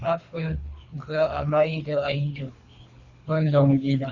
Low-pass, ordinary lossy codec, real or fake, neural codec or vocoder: 7.2 kHz; Opus, 64 kbps; fake; codec, 24 kHz, 3 kbps, HILCodec